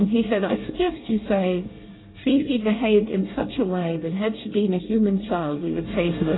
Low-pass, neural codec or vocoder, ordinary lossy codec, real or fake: 7.2 kHz; codec, 24 kHz, 1 kbps, SNAC; AAC, 16 kbps; fake